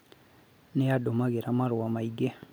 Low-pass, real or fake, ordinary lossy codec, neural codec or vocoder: none; real; none; none